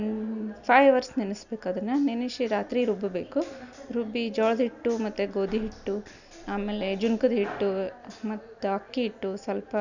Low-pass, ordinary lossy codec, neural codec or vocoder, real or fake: 7.2 kHz; none; none; real